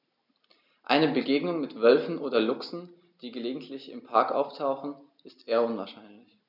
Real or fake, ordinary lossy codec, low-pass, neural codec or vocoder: real; none; 5.4 kHz; none